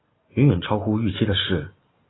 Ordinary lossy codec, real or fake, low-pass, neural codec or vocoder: AAC, 16 kbps; real; 7.2 kHz; none